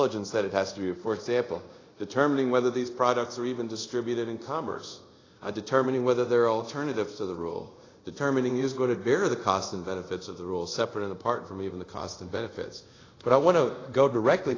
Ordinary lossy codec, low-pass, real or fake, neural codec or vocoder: AAC, 32 kbps; 7.2 kHz; fake; codec, 24 kHz, 0.5 kbps, DualCodec